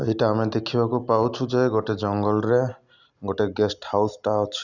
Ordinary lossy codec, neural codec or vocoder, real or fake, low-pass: none; none; real; 7.2 kHz